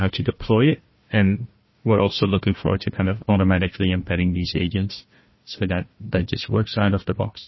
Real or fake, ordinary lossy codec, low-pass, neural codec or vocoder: fake; MP3, 24 kbps; 7.2 kHz; codec, 16 kHz, 1 kbps, FunCodec, trained on Chinese and English, 50 frames a second